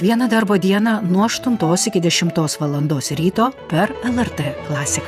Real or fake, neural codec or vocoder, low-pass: fake; vocoder, 48 kHz, 128 mel bands, Vocos; 14.4 kHz